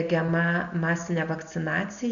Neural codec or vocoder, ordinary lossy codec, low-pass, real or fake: none; AAC, 96 kbps; 7.2 kHz; real